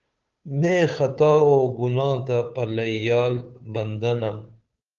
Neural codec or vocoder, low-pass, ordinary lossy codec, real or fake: codec, 16 kHz, 2 kbps, FunCodec, trained on Chinese and English, 25 frames a second; 7.2 kHz; Opus, 32 kbps; fake